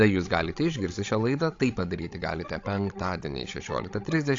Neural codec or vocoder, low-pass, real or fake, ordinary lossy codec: codec, 16 kHz, 16 kbps, FreqCodec, larger model; 7.2 kHz; fake; AAC, 48 kbps